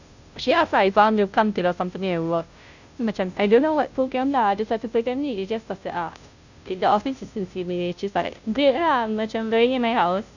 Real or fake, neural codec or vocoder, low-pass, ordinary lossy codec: fake; codec, 16 kHz, 0.5 kbps, FunCodec, trained on Chinese and English, 25 frames a second; 7.2 kHz; none